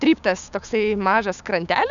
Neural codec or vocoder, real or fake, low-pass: codec, 16 kHz, 6 kbps, DAC; fake; 7.2 kHz